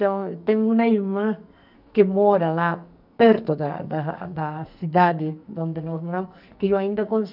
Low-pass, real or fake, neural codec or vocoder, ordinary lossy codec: 5.4 kHz; fake; codec, 44.1 kHz, 2.6 kbps, SNAC; none